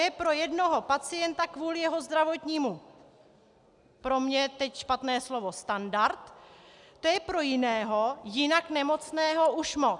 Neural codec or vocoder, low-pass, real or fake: none; 10.8 kHz; real